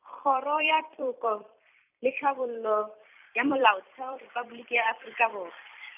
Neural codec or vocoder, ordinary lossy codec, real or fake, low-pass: vocoder, 44.1 kHz, 128 mel bands every 256 samples, BigVGAN v2; none; fake; 3.6 kHz